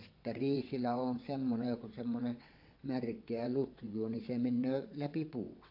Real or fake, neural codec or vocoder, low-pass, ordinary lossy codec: fake; vocoder, 22.05 kHz, 80 mel bands, WaveNeXt; 5.4 kHz; AAC, 32 kbps